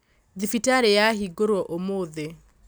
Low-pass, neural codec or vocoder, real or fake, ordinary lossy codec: none; none; real; none